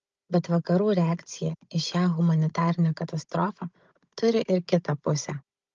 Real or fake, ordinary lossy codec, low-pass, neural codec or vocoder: fake; Opus, 24 kbps; 7.2 kHz; codec, 16 kHz, 16 kbps, FunCodec, trained on Chinese and English, 50 frames a second